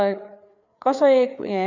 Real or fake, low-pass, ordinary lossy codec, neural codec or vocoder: fake; 7.2 kHz; none; codec, 16 kHz, 4 kbps, FreqCodec, larger model